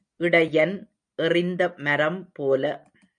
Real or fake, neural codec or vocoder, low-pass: real; none; 9.9 kHz